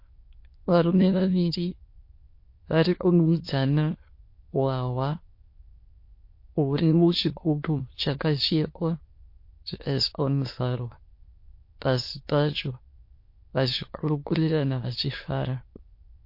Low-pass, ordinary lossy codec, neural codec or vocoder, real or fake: 5.4 kHz; MP3, 32 kbps; autoencoder, 22.05 kHz, a latent of 192 numbers a frame, VITS, trained on many speakers; fake